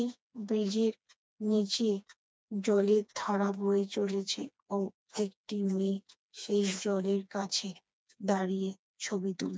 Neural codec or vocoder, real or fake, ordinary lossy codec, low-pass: codec, 16 kHz, 2 kbps, FreqCodec, smaller model; fake; none; none